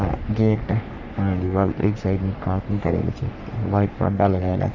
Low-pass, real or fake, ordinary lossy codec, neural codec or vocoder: 7.2 kHz; fake; none; codec, 44.1 kHz, 3.4 kbps, Pupu-Codec